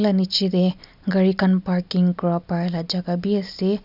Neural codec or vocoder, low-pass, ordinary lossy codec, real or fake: none; 5.4 kHz; none; real